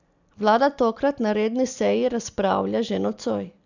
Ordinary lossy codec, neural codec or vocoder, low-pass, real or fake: none; none; 7.2 kHz; real